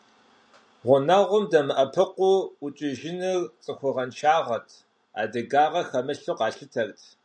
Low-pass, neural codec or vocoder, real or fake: 9.9 kHz; none; real